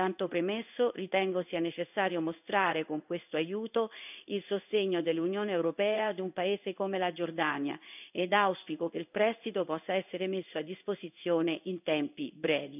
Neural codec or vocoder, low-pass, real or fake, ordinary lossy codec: codec, 16 kHz in and 24 kHz out, 1 kbps, XY-Tokenizer; 3.6 kHz; fake; none